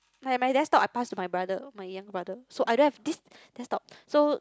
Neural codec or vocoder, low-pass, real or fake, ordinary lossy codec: none; none; real; none